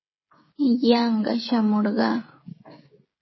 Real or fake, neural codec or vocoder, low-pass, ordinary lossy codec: fake; codec, 16 kHz, 16 kbps, FreqCodec, smaller model; 7.2 kHz; MP3, 24 kbps